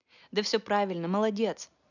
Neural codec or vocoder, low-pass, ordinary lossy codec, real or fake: none; 7.2 kHz; none; real